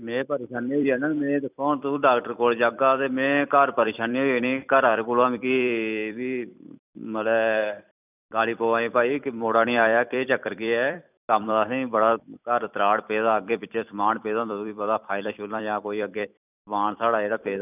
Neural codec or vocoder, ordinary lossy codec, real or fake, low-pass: vocoder, 44.1 kHz, 128 mel bands every 256 samples, BigVGAN v2; none; fake; 3.6 kHz